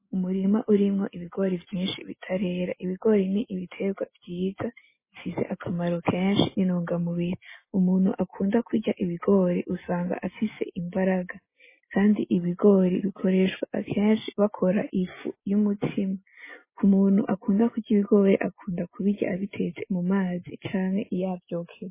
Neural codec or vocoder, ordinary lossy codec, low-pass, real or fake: none; MP3, 16 kbps; 3.6 kHz; real